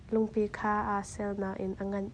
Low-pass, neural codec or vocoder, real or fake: 9.9 kHz; none; real